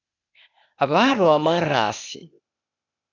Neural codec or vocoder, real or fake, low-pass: codec, 16 kHz, 0.8 kbps, ZipCodec; fake; 7.2 kHz